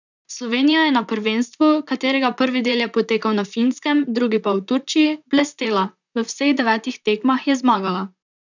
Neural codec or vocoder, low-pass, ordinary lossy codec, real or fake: vocoder, 44.1 kHz, 128 mel bands, Pupu-Vocoder; 7.2 kHz; none; fake